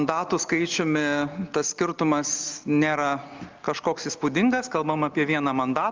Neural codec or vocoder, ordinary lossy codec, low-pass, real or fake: none; Opus, 24 kbps; 7.2 kHz; real